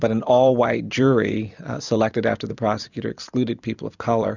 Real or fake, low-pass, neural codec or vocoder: fake; 7.2 kHz; vocoder, 44.1 kHz, 128 mel bands every 256 samples, BigVGAN v2